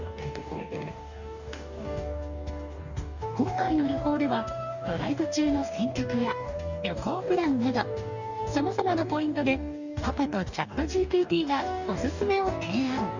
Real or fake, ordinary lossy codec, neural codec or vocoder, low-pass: fake; none; codec, 44.1 kHz, 2.6 kbps, DAC; 7.2 kHz